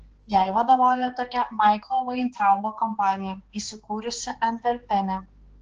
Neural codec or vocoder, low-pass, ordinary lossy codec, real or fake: codec, 16 kHz, 2 kbps, X-Codec, HuBERT features, trained on balanced general audio; 7.2 kHz; Opus, 16 kbps; fake